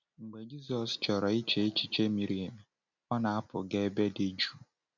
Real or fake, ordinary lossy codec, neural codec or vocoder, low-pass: real; none; none; 7.2 kHz